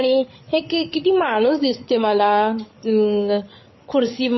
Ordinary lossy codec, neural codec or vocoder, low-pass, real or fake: MP3, 24 kbps; codec, 16 kHz, 8 kbps, FreqCodec, larger model; 7.2 kHz; fake